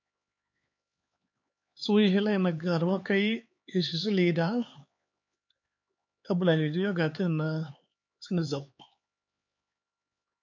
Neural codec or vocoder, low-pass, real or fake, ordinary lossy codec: codec, 16 kHz, 4 kbps, X-Codec, HuBERT features, trained on LibriSpeech; 7.2 kHz; fake; MP3, 48 kbps